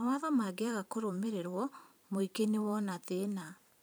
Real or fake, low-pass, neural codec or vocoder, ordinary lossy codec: fake; none; vocoder, 44.1 kHz, 128 mel bands every 512 samples, BigVGAN v2; none